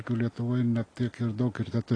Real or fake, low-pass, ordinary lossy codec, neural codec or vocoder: real; 9.9 kHz; AAC, 32 kbps; none